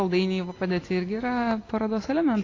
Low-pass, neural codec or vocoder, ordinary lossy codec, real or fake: 7.2 kHz; none; AAC, 32 kbps; real